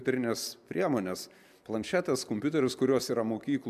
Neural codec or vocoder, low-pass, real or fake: none; 14.4 kHz; real